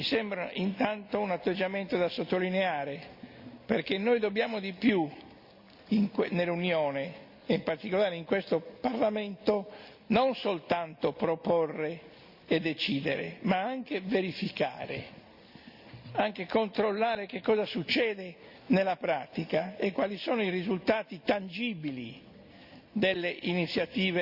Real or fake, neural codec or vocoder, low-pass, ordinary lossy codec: real; none; 5.4 kHz; Opus, 64 kbps